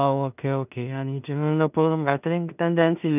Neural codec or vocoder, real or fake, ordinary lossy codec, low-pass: codec, 16 kHz in and 24 kHz out, 0.4 kbps, LongCat-Audio-Codec, two codebook decoder; fake; none; 3.6 kHz